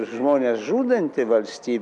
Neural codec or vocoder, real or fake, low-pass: none; real; 10.8 kHz